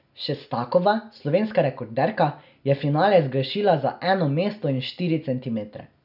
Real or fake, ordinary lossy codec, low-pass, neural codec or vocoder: real; none; 5.4 kHz; none